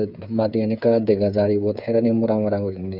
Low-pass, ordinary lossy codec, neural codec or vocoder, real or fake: 5.4 kHz; none; codec, 16 kHz, 8 kbps, FreqCodec, smaller model; fake